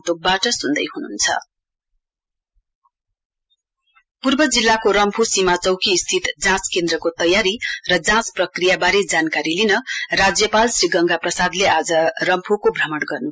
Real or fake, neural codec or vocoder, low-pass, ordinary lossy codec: real; none; none; none